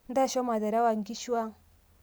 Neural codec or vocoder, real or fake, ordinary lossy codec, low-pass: none; real; none; none